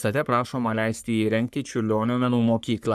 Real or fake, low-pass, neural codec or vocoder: fake; 14.4 kHz; codec, 44.1 kHz, 3.4 kbps, Pupu-Codec